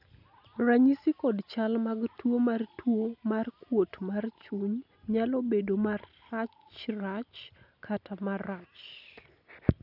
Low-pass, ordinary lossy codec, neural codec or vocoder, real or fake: 5.4 kHz; none; none; real